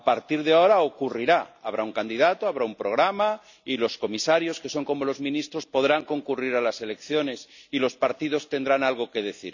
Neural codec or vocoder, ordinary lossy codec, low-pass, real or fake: none; none; 7.2 kHz; real